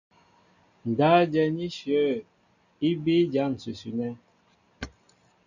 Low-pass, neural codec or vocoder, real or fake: 7.2 kHz; none; real